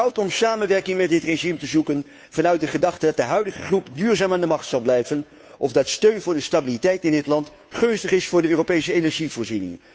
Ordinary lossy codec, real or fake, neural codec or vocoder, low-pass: none; fake; codec, 16 kHz, 2 kbps, FunCodec, trained on Chinese and English, 25 frames a second; none